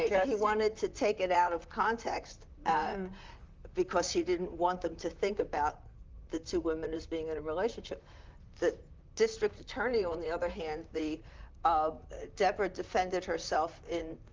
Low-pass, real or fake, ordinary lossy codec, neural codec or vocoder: 7.2 kHz; fake; Opus, 32 kbps; vocoder, 44.1 kHz, 128 mel bands, Pupu-Vocoder